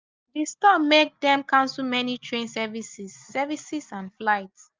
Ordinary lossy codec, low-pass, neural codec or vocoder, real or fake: Opus, 32 kbps; 7.2 kHz; none; real